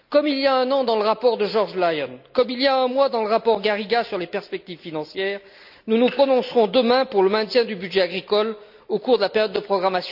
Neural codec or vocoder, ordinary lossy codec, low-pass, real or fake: none; none; 5.4 kHz; real